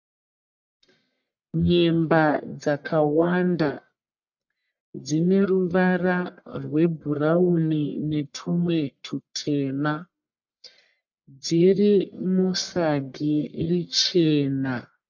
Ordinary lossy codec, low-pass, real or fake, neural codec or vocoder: MP3, 64 kbps; 7.2 kHz; fake; codec, 44.1 kHz, 1.7 kbps, Pupu-Codec